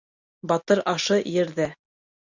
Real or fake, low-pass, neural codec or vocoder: real; 7.2 kHz; none